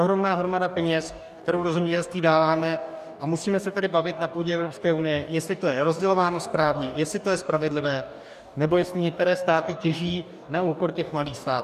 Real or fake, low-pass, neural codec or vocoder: fake; 14.4 kHz; codec, 44.1 kHz, 2.6 kbps, DAC